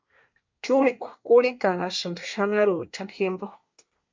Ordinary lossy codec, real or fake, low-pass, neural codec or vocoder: MP3, 64 kbps; fake; 7.2 kHz; codec, 24 kHz, 1 kbps, SNAC